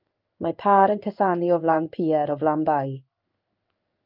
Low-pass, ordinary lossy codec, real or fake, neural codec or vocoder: 5.4 kHz; Opus, 32 kbps; fake; codec, 16 kHz in and 24 kHz out, 1 kbps, XY-Tokenizer